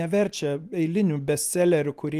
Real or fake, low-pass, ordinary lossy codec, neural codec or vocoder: real; 14.4 kHz; Opus, 24 kbps; none